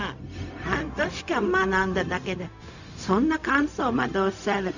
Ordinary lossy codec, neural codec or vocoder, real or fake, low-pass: none; codec, 16 kHz, 0.4 kbps, LongCat-Audio-Codec; fake; 7.2 kHz